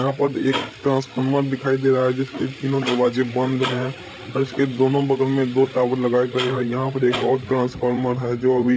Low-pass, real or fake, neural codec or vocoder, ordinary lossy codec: none; fake; codec, 16 kHz, 16 kbps, FreqCodec, larger model; none